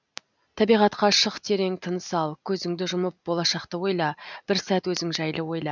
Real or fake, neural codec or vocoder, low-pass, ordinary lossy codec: real; none; 7.2 kHz; none